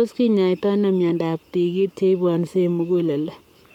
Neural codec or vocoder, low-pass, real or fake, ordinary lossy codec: codec, 44.1 kHz, 7.8 kbps, Pupu-Codec; 19.8 kHz; fake; none